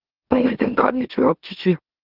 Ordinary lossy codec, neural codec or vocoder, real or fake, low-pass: Opus, 16 kbps; autoencoder, 44.1 kHz, a latent of 192 numbers a frame, MeloTTS; fake; 5.4 kHz